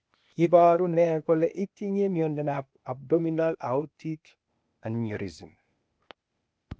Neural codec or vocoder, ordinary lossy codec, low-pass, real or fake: codec, 16 kHz, 0.8 kbps, ZipCodec; none; none; fake